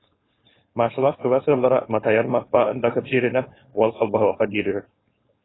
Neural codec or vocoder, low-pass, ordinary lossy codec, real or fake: codec, 16 kHz, 4.8 kbps, FACodec; 7.2 kHz; AAC, 16 kbps; fake